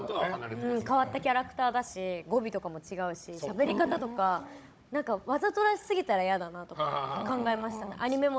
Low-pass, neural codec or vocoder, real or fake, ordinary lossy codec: none; codec, 16 kHz, 16 kbps, FunCodec, trained on Chinese and English, 50 frames a second; fake; none